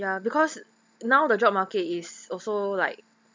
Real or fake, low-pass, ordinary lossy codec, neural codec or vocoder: real; none; none; none